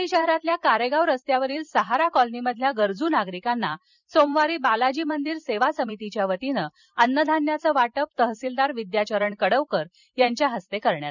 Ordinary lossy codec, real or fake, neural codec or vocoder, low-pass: none; fake; vocoder, 44.1 kHz, 128 mel bands every 256 samples, BigVGAN v2; 7.2 kHz